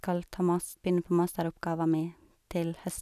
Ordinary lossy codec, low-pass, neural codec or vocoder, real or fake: none; 14.4 kHz; none; real